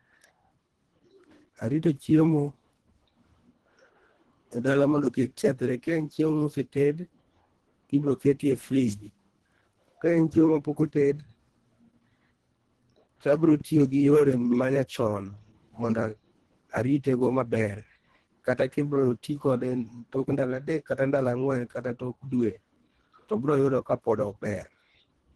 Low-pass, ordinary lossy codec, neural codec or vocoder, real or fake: 10.8 kHz; Opus, 16 kbps; codec, 24 kHz, 1.5 kbps, HILCodec; fake